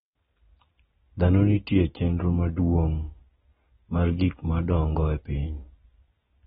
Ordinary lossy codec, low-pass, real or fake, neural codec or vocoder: AAC, 16 kbps; 14.4 kHz; real; none